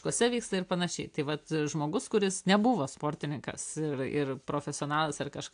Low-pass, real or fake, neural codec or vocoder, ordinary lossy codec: 9.9 kHz; real; none; AAC, 64 kbps